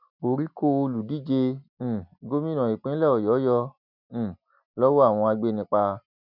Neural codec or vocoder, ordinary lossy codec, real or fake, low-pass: none; none; real; 5.4 kHz